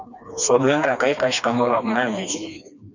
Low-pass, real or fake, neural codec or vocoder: 7.2 kHz; fake; codec, 16 kHz, 2 kbps, FreqCodec, smaller model